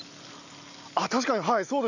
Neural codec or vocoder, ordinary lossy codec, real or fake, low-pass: none; none; real; 7.2 kHz